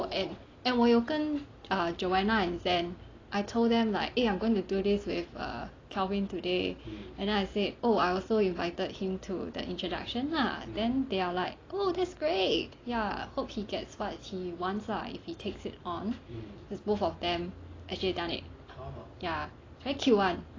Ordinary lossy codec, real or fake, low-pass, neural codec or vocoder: AAC, 32 kbps; real; 7.2 kHz; none